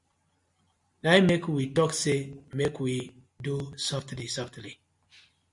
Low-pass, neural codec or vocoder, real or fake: 10.8 kHz; none; real